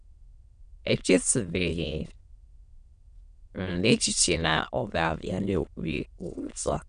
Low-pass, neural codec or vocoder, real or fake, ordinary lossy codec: 9.9 kHz; autoencoder, 22.05 kHz, a latent of 192 numbers a frame, VITS, trained on many speakers; fake; none